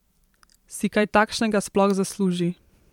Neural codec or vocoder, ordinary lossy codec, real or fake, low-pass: vocoder, 44.1 kHz, 128 mel bands every 512 samples, BigVGAN v2; MP3, 96 kbps; fake; 19.8 kHz